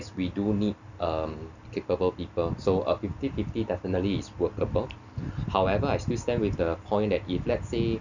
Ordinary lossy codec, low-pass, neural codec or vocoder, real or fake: none; 7.2 kHz; none; real